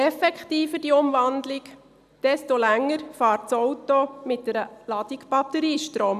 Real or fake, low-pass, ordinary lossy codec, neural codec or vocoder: real; 14.4 kHz; none; none